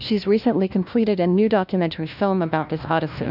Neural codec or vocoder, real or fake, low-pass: codec, 16 kHz, 1 kbps, FunCodec, trained on LibriTTS, 50 frames a second; fake; 5.4 kHz